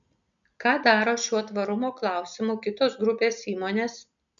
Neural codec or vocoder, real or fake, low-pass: none; real; 7.2 kHz